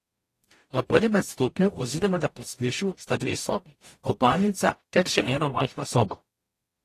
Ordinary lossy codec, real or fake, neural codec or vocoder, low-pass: AAC, 48 kbps; fake; codec, 44.1 kHz, 0.9 kbps, DAC; 14.4 kHz